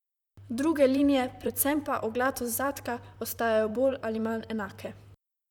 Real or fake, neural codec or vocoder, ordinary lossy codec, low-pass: fake; vocoder, 44.1 kHz, 128 mel bands, Pupu-Vocoder; none; 19.8 kHz